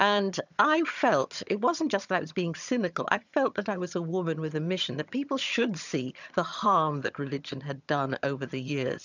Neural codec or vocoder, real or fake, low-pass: vocoder, 22.05 kHz, 80 mel bands, HiFi-GAN; fake; 7.2 kHz